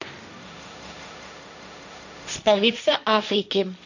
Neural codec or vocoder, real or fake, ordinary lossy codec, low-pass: codec, 16 kHz, 1.1 kbps, Voila-Tokenizer; fake; none; 7.2 kHz